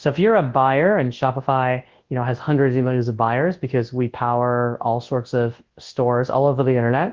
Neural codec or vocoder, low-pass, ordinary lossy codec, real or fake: codec, 24 kHz, 0.9 kbps, WavTokenizer, large speech release; 7.2 kHz; Opus, 16 kbps; fake